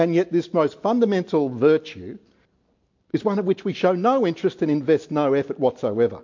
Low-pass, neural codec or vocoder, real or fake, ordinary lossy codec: 7.2 kHz; none; real; MP3, 48 kbps